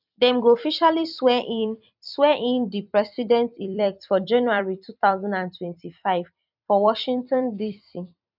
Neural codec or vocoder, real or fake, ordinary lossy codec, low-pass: vocoder, 44.1 kHz, 128 mel bands every 256 samples, BigVGAN v2; fake; none; 5.4 kHz